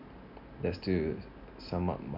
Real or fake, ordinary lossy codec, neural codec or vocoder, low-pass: real; none; none; 5.4 kHz